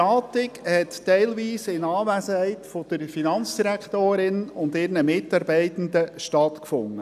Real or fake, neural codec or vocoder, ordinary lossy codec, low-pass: real; none; none; 14.4 kHz